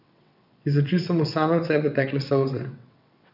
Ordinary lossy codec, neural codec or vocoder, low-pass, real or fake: none; vocoder, 22.05 kHz, 80 mel bands, WaveNeXt; 5.4 kHz; fake